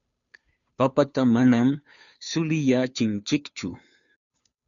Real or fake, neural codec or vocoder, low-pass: fake; codec, 16 kHz, 2 kbps, FunCodec, trained on Chinese and English, 25 frames a second; 7.2 kHz